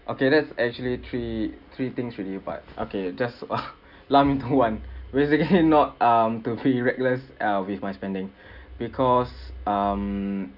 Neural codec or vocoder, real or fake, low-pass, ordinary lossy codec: none; real; 5.4 kHz; none